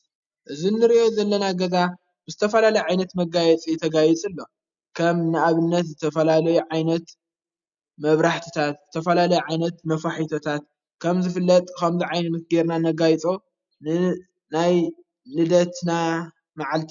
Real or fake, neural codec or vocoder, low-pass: real; none; 7.2 kHz